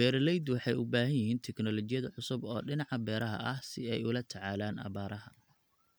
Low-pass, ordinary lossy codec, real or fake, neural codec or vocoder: none; none; real; none